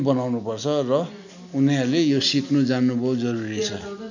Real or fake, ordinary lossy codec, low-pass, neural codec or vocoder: real; none; 7.2 kHz; none